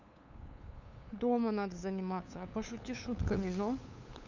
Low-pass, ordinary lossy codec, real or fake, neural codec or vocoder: 7.2 kHz; none; fake; codec, 16 kHz, 8 kbps, FunCodec, trained on LibriTTS, 25 frames a second